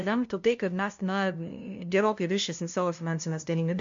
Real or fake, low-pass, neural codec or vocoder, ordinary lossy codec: fake; 7.2 kHz; codec, 16 kHz, 0.5 kbps, FunCodec, trained on LibriTTS, 25 frames a second; MP3, 48 kbps